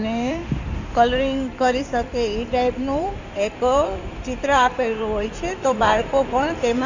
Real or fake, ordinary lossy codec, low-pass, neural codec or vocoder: fake; none; 7.2 kHz; codec, 16 kHz in and 24 kHz out, 2.2 kbps, FireRedTTS-2 codec